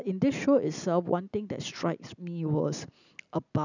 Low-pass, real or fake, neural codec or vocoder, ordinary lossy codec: 7.2 kHz; real; none; none